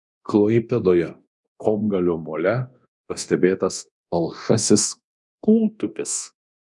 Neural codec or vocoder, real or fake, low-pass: codec, 24 kHz, 0.9 kbps, DualCodec; fake; 10.8 kHz